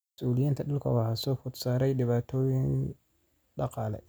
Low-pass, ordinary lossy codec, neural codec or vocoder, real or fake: none; none; none; real